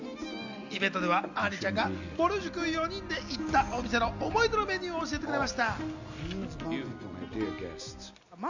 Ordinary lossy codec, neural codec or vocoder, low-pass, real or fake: Opus, 64 kbps; none; 7.2 kHz; real